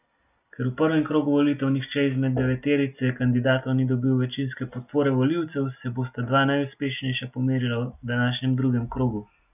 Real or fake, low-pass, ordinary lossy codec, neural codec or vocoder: real; 3.6 kHz; none; none